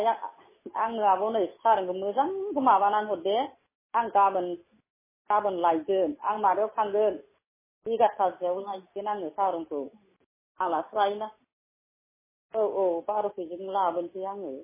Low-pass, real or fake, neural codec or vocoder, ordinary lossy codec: 3.6 kHz; real; none; MP3, 16 kbps